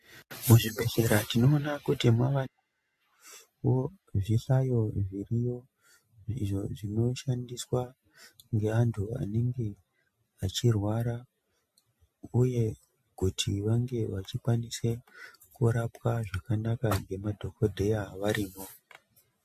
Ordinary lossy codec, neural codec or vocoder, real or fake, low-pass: MP3, 64 kbps; none; real; 14.4 kHz